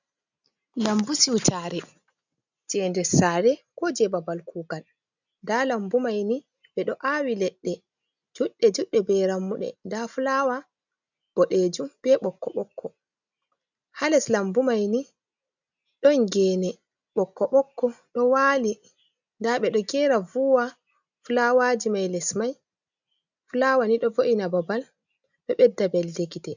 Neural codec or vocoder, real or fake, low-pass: none; real; 7.2 kHz